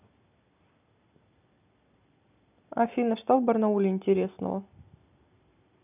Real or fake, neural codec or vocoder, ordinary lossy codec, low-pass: real; none; none; 3.6 kHz